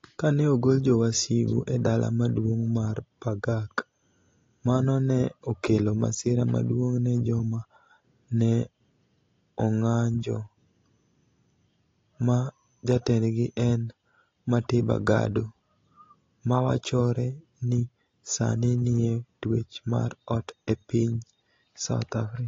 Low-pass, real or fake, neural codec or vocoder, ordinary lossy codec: 7.2 kHz; real; none; AAC, 32 kbps